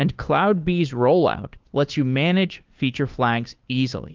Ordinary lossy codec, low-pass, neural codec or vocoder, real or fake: Opus, 32 kbps; 7.2 kHz; codec, 16 kHz, 2 kbps, FunCodec, trained on Chinese and English, 25 frames a second; fake